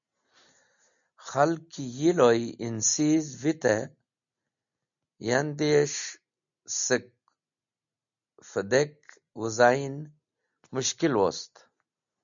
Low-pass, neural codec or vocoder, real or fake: 7.2 kHz; none; real